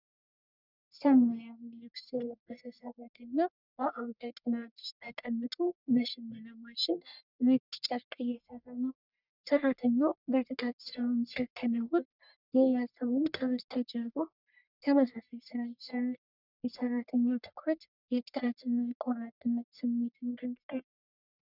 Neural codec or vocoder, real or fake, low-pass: codec, 44.1 kHz, 1.7 kbps, Pupu-Codec; fake; 5.4 kHz